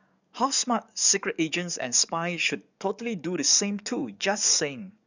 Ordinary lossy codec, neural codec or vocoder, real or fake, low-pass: none; codec, 44.1 kHz, 7.8 kbps, DAC; fake; 7.2 kHz